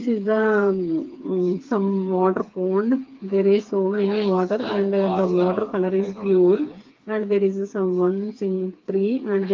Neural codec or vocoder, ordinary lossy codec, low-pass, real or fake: codec, 16 kHz, 4 kbps, FreqCodec, smaller model; Opus, 32 kbps; 7.2 kHz; fake